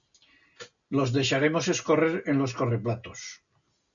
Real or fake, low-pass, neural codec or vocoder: real; 7.2 kHz; none